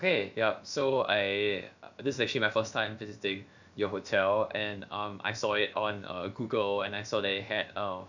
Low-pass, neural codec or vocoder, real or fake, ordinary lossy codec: 7.2 kHz; codec, 16 kHz, about 1 kbps, DyCAST, with the encoder's durations; fake; none